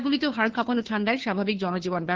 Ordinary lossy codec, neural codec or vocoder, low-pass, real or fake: Opus, 16 kbps; codec, 16 kHz, 2 kbps, FunCodec, trained on Chinese and English, 25 frames a second; 7.2 kHz; fake